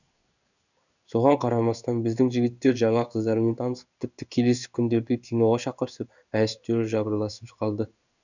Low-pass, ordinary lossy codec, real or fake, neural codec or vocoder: 7.2 kHz; none; fake; codec, 16 kHz in and 24 kHz out, 1 kbps, XY-Tokenizer